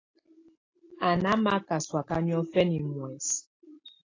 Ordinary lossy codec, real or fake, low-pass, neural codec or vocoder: AAC, 32 kbps; real; 7.2 kHz; none